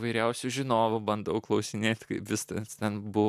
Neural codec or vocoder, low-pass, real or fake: none; 14.4 kHz; real